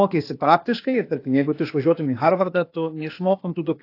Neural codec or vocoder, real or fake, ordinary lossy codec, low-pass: codec, 16 kHz, 0.8 kbps, ZipCodec; fake; AAC, 32 kbps; 5.4 kHz